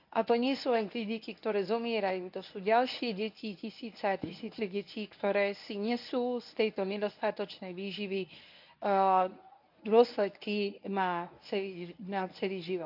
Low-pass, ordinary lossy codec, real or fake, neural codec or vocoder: 5.4 kHz; none; fake; codec, 24 kHz, 0.9 kbps, WavTokenizer, medium speech release version 1